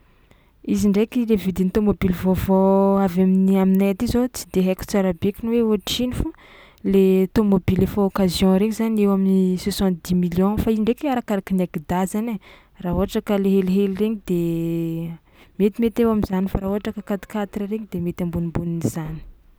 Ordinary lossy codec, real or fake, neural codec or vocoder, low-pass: none; real; none; none